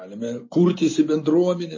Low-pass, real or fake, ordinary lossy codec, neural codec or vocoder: 7.2 kHz; real; MP3, 32 kbps; none